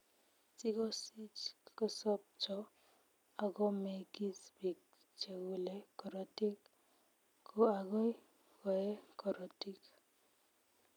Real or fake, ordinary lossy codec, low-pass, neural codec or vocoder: real; none; 19.8 kHz; none